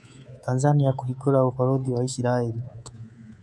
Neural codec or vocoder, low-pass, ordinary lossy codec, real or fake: codec, 24 kHz, 3.1 kbps, DualCodec; none; none; fake